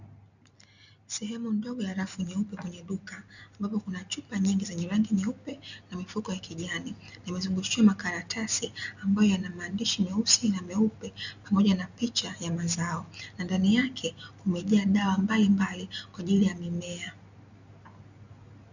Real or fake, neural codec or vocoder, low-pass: real; none; 7.2 kHz